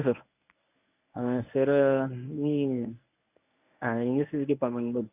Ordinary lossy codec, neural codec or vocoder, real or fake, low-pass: none; codec, 24 kHz, 0.9 kbps, WavTokenizer, medium speech release version 1; fake; 3.6 kHz